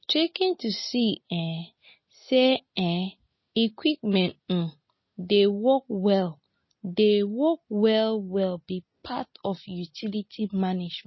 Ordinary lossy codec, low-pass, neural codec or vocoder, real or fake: MP3, 24 kbps; 7.2 kHz; none; real